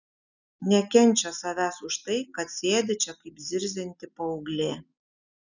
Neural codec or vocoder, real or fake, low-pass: none; real; 7.2 kHz